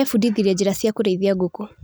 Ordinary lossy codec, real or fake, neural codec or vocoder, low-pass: none; real; none; none